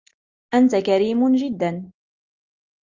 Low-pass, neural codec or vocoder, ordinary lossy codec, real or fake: 7.2 kHz; none; Opus, 32 kbps; real